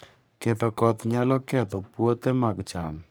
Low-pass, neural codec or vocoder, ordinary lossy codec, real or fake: none; codec, 44.1 kHz, 3.4 kbps, Pupu-Codec; none; fake